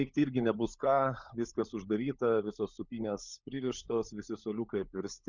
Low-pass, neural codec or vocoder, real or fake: 7.2 kHz; codec, 16 kHz, 16 kbps, FunCodec, trained on LibriTTS, 50 frames a second; fake